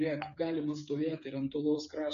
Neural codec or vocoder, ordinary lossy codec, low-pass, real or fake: codec, 16 kHz, 8 kbps, FreqCodec, smaller model; AAC, 64 kbps; 7.2 kHz; fake